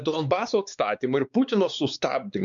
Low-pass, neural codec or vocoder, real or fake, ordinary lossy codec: 7.2 kHz; codec, 16 kHz, 4 kbps, X-Codec, WavLM features, trained on Multilingual LibriSpeech; fake; MP3, 96 kbps